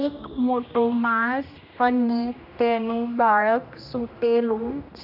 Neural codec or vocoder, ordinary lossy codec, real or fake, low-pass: codec, 16 kHz, 1 kbps, X-Codec, HuBERT features, trained on general audio; none; fake; 5.4 kHz